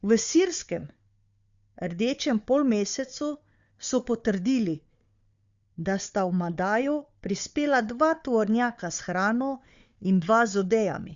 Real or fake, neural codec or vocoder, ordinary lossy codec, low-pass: fake; codec, 16 kHz, 4 kbps, FunCodec, trained on Chinese and English, 50 frames a second; Opus, 64 kbps; 7.2 kHz